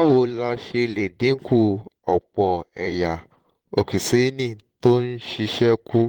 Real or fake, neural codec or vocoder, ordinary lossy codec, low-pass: fake; vocoder, 44.1 kHz, 128 mel bands, Pupu-Vocoder; Opus, 16 kbps; 19.8 kHz